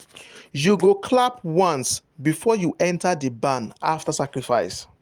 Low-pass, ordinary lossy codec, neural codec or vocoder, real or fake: 19.8 kHz; Opus, 32 kbps; vocoder, 44.1 kHz, 128 mel bands every 512 samples, BigVGAN v2; fake